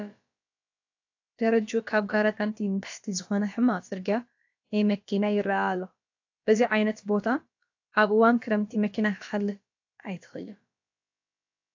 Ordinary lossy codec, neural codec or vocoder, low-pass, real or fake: AAC, 48 kbps; codec, 16 kHz, about 1 kbps, DyCAST, with the encoder's durations; 7.2 kHz; fake